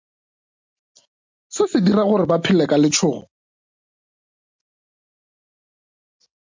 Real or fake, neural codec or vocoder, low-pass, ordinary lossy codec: real; none; 7.2 kHz; MP3, 64 kbps